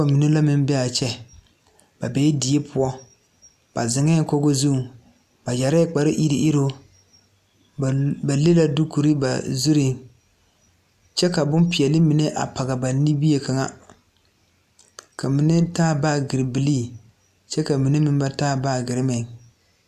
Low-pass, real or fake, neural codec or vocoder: 14.4 kHz; real; none